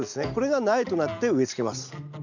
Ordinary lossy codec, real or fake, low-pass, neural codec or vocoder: none; real; 7.2 kHz; none